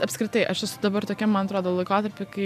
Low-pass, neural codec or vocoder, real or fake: 14.4 kHz; none; real